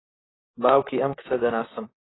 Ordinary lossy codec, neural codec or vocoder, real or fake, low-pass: AAC, 16 kbps; vocoder, 44.1 kHz, 128 mel bands, Pupu-Vocoder; fake; 7.2 kHz